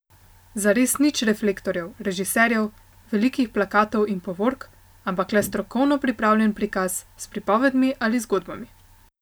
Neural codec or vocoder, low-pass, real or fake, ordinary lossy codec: none; none; real; none